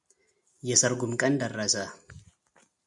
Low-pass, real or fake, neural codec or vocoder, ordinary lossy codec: 10.8 kHz; real; none; MP3, 96 kbps